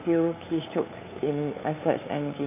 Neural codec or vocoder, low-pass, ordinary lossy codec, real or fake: codec, 16 kHz, 8 kbps, FunCodec, trained on LibriTTS, 25 frames a second; 3.6 kHz; AAC, 24 kbps; fake